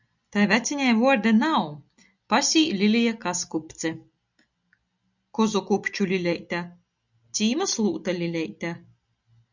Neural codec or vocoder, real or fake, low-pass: none; real; 7.2 kHz